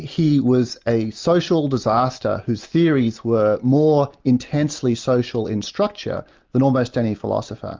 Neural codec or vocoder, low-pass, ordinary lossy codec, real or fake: none; 7.2 kHz; Opus, 32 kbps; real